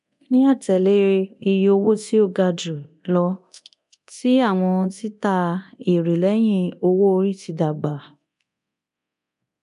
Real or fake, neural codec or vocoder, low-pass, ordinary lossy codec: fake; codec, 24 kHz, 0.9 kbps, DualCodec; 10.8 kHz; AAC, 96 kbps